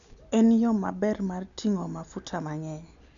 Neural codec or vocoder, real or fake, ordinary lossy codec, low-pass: none; real; none; 7.2 kHz